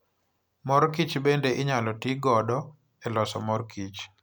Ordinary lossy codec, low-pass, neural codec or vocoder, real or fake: none; none; none; real